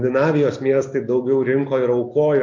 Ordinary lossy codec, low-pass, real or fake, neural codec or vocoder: MP3, 48 kbps; 7.2 kHz; real; none